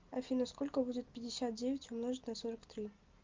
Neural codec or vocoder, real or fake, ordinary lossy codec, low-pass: none; real; Opus, 24 kbps; 7.2 kHz